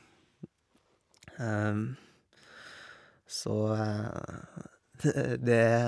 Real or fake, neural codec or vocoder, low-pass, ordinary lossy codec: real; none; none; none